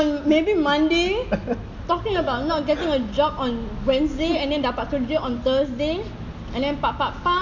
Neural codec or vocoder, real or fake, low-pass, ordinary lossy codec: none; real; 7.2 kHz; none